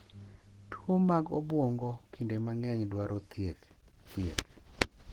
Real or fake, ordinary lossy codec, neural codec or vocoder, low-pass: fake; Opus, 16 kbps; codec, 44.1 kHz, 7.8 kbps, Pupu-Codec; 19.8 kHz